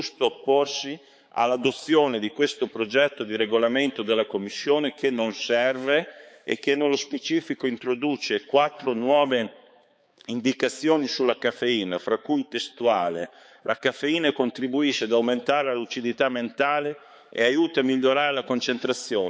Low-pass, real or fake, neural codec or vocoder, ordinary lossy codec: none; fake; codec, 16 kHz, 4 kbps, X-Codec, HuBERT features, trained on balanced general audio; none